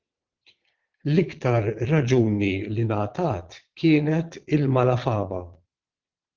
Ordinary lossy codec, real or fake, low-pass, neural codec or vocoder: Opus, 16 kbps; fake; 7.2 kHz; vocoder, 22.05 kHz, 80 mel bands, Vocos